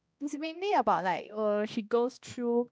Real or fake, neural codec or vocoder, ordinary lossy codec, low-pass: fake; codec, 16 kHz, 1 kbps, X-Codec, HuBERT features, trained on balanced general audio; none; none